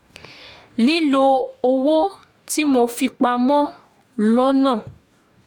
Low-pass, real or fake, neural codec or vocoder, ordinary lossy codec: 19.8 kHz; fake; codec, 44.1 kHz, 2.6 kbps, DAC; none